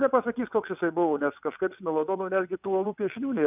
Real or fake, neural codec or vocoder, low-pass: fake; vocoder, 22.05 kHz, 80 mel bands, WaveNeXt; 3.6 kHz